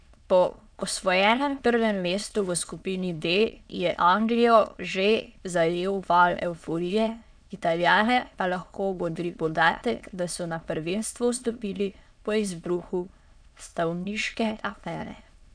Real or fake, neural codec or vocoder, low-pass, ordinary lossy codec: fake; autoencoder, 22.05 kHz, a latent of 192 numbers a frame, VITS, trained on many speakers; 9.9 kHz; none